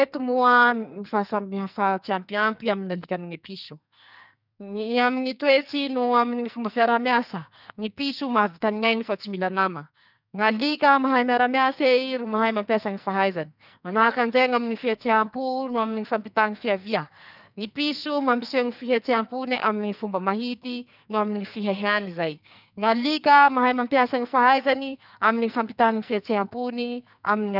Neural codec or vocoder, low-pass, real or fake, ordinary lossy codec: codec, 44.1 kHz, 2.6 kbps, SNAC; 5.4 kHz; fake; none